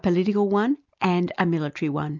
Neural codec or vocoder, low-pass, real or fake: none; 7.2 kHz; real